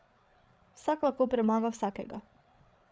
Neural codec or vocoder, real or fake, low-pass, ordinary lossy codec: codec, 16 kHz, 8 kbps, FreqCodec, larger model; fake; none; none